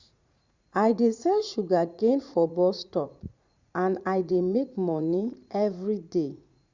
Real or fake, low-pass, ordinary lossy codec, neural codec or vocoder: fake; 7.2 kHz; Opus, 64 kbps; vocoder, 44.1 kHz, 80 mel bands, Vocos